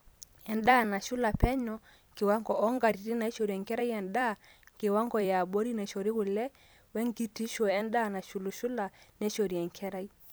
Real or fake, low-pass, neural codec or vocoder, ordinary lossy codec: fake; none; vocoder, 44.1 kHz, 128 mel bands every 256 samples, BigVGAN v2; none